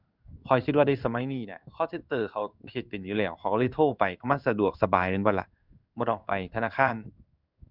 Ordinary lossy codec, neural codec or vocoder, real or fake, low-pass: none; codec, 16 kHz in and 24 kHz out, 1 kbps, XY-Tokenizer; fake; 5.4 kHz